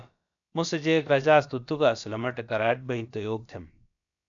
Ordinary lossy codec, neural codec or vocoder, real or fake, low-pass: MP3, 96 kbps; codec, 16 kHz, about 1 kbps, DyCAST, with the encoder's durations; fake; 7.2 kHz